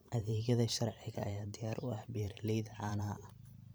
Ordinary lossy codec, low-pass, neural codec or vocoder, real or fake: none; none; vocoder, 44.1 kHz, 128 mel bands every 512 samples, BigVGAN v2; fake